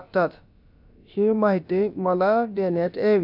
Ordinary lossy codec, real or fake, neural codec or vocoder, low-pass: none; fake; codec, 16 kHz, about 1 kbps, DyCAST, with the encoder's durations; 5.4 kHz